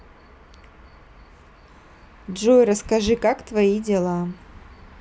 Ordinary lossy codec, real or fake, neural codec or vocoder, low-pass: none; real; none; none